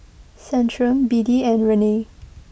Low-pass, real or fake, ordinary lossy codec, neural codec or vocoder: none; real; none; none